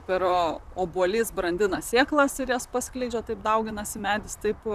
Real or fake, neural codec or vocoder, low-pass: fake; vocoder, 44.1 kHz, 128 mel bands, Pupu-Vocoder; 14.4 kHz